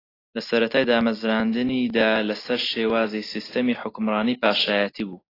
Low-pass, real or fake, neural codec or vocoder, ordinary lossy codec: 5.4 kHz; real; none; AAC, 24 kbps